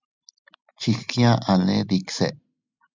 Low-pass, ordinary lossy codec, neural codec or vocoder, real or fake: 7.2 kHz; MP3, 64 kbps; none; real